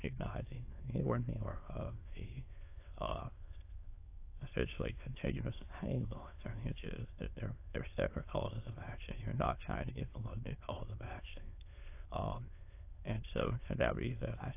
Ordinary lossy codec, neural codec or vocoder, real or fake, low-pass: AAC, 24 kbps; autoencoder, 22.05 kHz, a latent of 192 numbers a frame, VITS, trained on many speakers; fake; 3.6 kHz